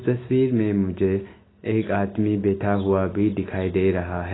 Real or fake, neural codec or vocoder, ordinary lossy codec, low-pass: real; none; AAC, 16 kbps; 7.2 kHz